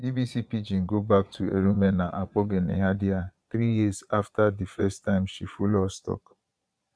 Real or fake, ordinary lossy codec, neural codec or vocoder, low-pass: fake; none; vocoder, 22.05 kHz, 80 mel bands, Vocos; none